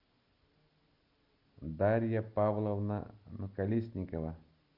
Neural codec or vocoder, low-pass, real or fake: none; 5.4 kHz; real